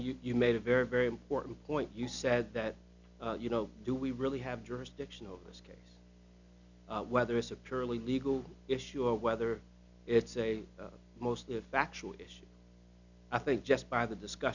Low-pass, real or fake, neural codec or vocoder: 7.2 kHz; real; none